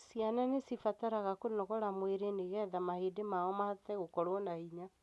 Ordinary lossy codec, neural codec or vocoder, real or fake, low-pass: none; none; real; none